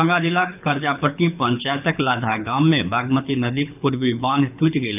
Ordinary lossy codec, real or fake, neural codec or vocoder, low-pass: none; fake; codec, 24 kHz, 6 kbps, HILCodec; 3.6 kHz